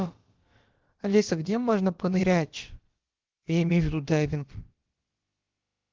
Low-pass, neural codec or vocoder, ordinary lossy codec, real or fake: 7.2 kHz; codec, 16 kHz, about 1 kbps, DyCAST, with the encoder's durations; Opus, 16 kbps; fake